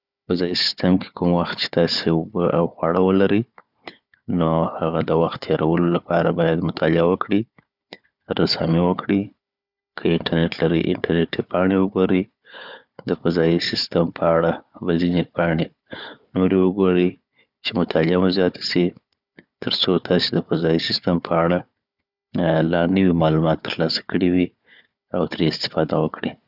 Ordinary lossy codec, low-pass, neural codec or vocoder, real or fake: none; 5.4 kHz; codec, 16 kHz, 4 kbps, FunCodec, trained on Chinese and English, 50 frames a second; fake